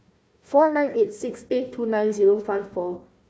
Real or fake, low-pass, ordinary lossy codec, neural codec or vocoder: fake; none; none; codec, 16 kHz, 1 kbps, FunCodec, trained on Chinese and English, 50 frames a second